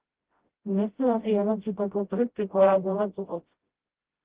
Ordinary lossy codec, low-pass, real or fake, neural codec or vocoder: Opus, 16 kbps; 3.6 kHz; fake; codec, 16 kHz, 0.5 kbps, FreqCodec, smaller model